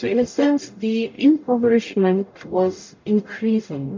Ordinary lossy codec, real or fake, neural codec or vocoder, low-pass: MP3, 48 kbps; fake; codec, 44.1 kHz, 0.9 kbps, DAC; 7.2 kHz